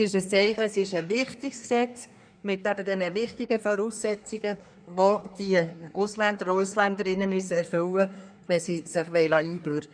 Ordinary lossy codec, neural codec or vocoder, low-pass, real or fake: none; codec, 24 kHz, 1 kbps, SNAC; 9.9 kHz; fake